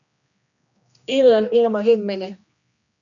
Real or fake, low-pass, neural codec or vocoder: fake; 7.2 kHz; codec, 16 kHz, 1 kbps, X-Codec, HuBERT features, trained on general audio